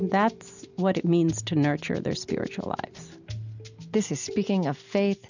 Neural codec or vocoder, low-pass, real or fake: none; 7.2 kHz; real